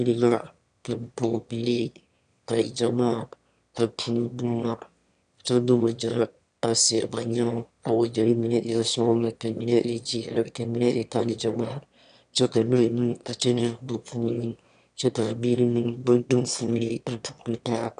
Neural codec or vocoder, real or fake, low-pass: autoencoder, 22.05 kHz, a latent of 192 numbers a frame, VITS, trained on one speaker; fake; 9.9 kHz